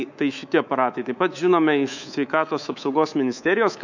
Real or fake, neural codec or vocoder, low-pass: fake; codec, 24 kHz, 3.1 kbps, DualCodec; 7.2 kHz